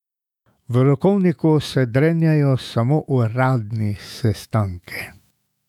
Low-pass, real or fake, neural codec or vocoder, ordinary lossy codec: 19.8 kHz; fake; autoencoder, 48 kHz, 128 numbers a frame, DAC-VAE, trained on Japanese speech; none